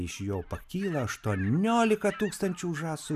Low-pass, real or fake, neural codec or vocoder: 14.4 kHz; real; none